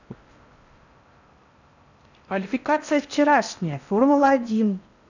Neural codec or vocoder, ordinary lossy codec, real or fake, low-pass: codec, 16 kHz in and 24 kHz out, 0.6 kbps, FocalCodec, streaming, 4096 codes; none; fake; 7.2 kHz